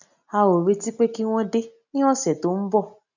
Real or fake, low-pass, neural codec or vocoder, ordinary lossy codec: real; 7.2 kHz; none; none